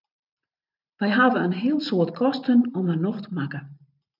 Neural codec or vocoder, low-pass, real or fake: vocoder, 44.1 kHz, 128 mel bands every 512 samples, BigVGAN v2; 5.4 kHz; fake